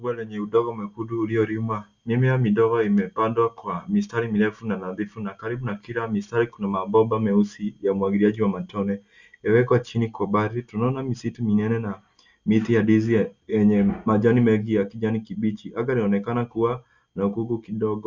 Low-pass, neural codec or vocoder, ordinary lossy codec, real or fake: 7.2 kHz; none; Opus, 64 kbps; real